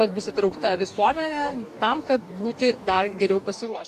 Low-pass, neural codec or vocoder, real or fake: 14.4 kHz; codec, 44.1 kHz, 2.6 kbps, DAC; fake